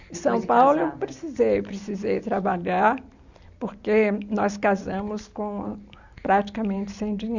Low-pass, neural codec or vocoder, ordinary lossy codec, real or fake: 7.2 kHz; none; Opus, 64 kbps; real